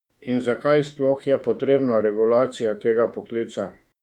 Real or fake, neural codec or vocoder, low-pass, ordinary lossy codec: fake; autoencoder, 48 kHz, 32 numbers a frame, DAC-VAE, trained on Japanese speech; 19.8 kHz; Opus, 64 kbps